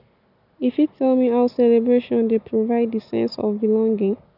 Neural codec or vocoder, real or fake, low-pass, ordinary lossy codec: none; real; 5.4 kHz; none